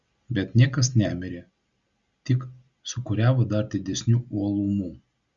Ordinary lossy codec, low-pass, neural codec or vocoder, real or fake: Opus, 64 kbps; 7.2 kHz; none; real